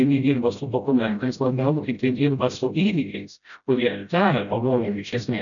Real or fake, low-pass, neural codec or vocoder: fake; 7.2 kHz; codec, 16 kHz, 0.5 kbps, FreqCodec, smaller model